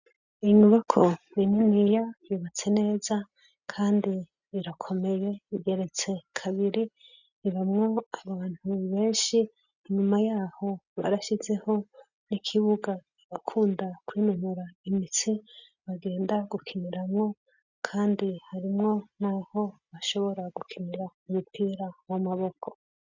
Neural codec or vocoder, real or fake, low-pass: none; real; 7.2 kHz